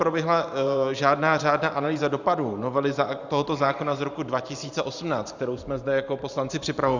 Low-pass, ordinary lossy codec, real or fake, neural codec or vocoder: 7.2 kHz; Opus, 64 kbps; fake; vocoder, 44.1 kHz, 128 mel bands every 512 samples, BigVGAN v2